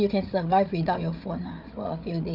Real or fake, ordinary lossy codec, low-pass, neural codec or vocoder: fake; none; 5.4 kHz; codec, 16 kHz, 8 kbps, FreqCodec, larger model